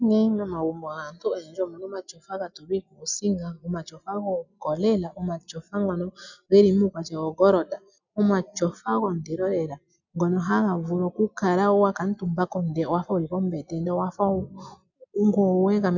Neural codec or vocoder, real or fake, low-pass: none; real; 7.2 kHz